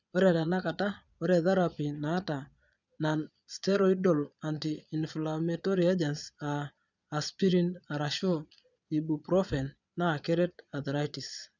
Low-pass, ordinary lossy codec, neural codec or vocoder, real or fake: 7.2 kHz; none; vocoder, 22.05 kHz, 80 mel bands, Vocos; fake